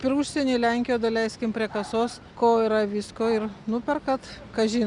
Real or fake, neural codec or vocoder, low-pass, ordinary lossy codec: real; none; 10.8 kHz; Opus, 64 kbps